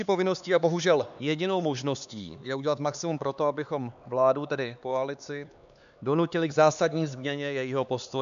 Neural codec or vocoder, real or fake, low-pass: codec, 16 kHz, 4 kbps, X-Codec, HuBERT features, trained on LibriSpeech; fake; 7.2 kHz